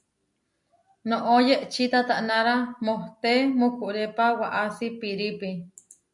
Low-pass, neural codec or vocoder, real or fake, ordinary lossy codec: 10.8 kHz; none; real; MP3, 64 kbps